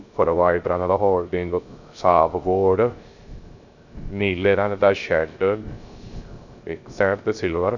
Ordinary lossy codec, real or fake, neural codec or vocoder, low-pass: none; fake; codec, 16 kHz, 0.3 kbps, FocalCodec; 7.2 kHz